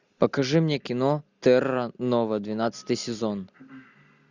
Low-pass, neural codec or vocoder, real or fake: 7.2 kHz; none; real